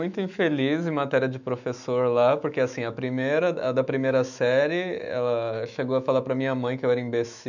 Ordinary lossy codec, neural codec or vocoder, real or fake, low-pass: none; autoencoder, 48 kHz, 128 numbers a frame, DAC-VAE, trained on Japanese speech; fake; 7.2 kHz